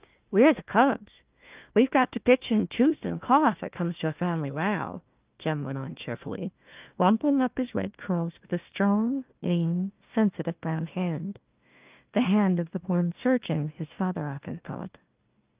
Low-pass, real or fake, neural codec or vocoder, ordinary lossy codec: 3.6 kHz; fake; codec, 16 kHz, 1 kbps, FunCodec, trained on Chinese and English, 50 frames a second; Opus, 24 kbps